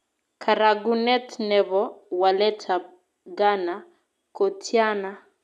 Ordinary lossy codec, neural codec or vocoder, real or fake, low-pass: none; none; real; none